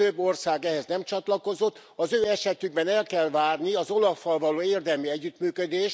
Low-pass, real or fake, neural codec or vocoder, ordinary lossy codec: none; real; none; none